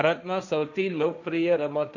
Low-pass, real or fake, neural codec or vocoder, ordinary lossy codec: 7.2 kHz; fake; codec, 16 kHz, 1.1 kbps, Voila-Tokenizer; none